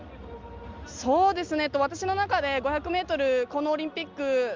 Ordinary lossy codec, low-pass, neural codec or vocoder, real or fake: Opus, 32 kbps; 7.2 kHz; none; real